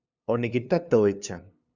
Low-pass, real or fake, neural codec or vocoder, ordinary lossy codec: 7.2 kHz; fake; codec, 16 kHz, 2 kbps, FunCodec, trained on LibriTTS, 25 frames a second; Opus, 64 kbps